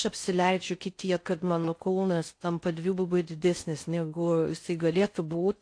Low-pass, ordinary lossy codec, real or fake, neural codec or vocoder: 9.9 kHz; AAC, 48 kbps; fake; codec, 16 kHz in and 24 kHz out, 0.6 kbps, FocalCodec, streaming, 4096 codes